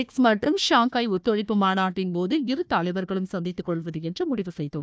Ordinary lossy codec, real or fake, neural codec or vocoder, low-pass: none; fake; codec, 16 kHz, 1 kbps, FunCodec, trained on Chinese and English, 50 frames a second; none